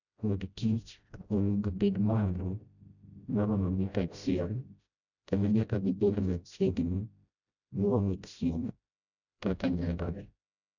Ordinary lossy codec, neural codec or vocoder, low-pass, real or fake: none; codec, 16 kHz, 0.5 kbps, FreqCodec, smaller model; 7.2 kHz; fake